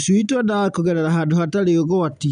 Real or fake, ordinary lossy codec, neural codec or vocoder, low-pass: real; none; none; 9.9 kHz